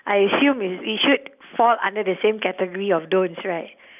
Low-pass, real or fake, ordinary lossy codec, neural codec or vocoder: 3.6 kHz; real; none; none